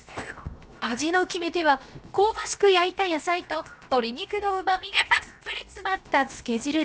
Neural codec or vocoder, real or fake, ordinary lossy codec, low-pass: codec, 16 kHz, 0.7 kbps, FocalCodec; fake; none; none